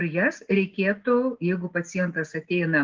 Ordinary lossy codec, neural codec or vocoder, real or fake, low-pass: Opus, 16 kbps; none; real; 7.2 kHz